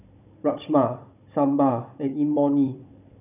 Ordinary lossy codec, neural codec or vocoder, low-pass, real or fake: none; codec, 16 kHz, 16 kbps, FunCodec, trained on Chinese and English, 50 frames a second; 3.6 kHz; fake